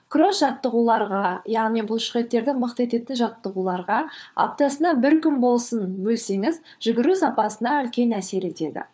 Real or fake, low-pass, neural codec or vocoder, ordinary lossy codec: fake; none; codec, 16 kHz, 4 kbps, FunCodec, trained on LibriTTS, 50 frames a second; none